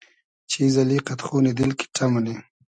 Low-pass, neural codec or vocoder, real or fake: 9.9 kHz; none; real